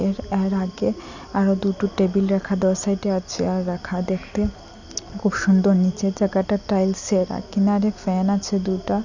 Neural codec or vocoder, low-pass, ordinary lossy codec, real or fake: none; 7.2 kHz; none; real